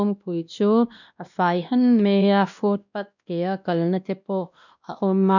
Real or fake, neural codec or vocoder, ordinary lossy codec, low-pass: fake; codec, 16 kHz, 1 kbps, X-Codec, WavLM features, trained on Multilingual LibriSpeech; none; 7.2 kHz